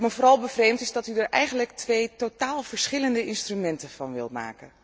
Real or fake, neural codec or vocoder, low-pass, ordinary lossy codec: real; none; none; none